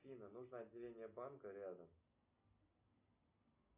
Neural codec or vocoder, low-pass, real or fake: none; 3.6 kHz; real